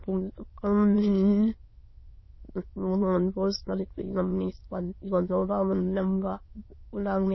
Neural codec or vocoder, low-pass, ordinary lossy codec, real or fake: autoencoder, 22.05 kHz, a latent of 192 numbers a frame, VITS, trained on many speakers; 7.2 kHz; MP3, 24 kbps; fake